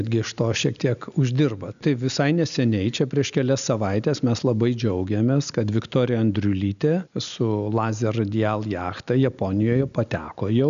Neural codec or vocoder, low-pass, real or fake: none; 7.2 kHz; real